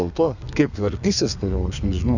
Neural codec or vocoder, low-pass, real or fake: codec, 32 kHz, 1.9 kbps, SNAC; 7.2 kHz; fake